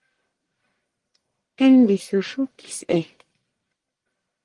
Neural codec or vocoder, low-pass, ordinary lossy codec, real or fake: codec, 44.1 kHz, 1.7 kbps, Pupu-Codec; 10.8 kHz; Opus, 24 kbps; fake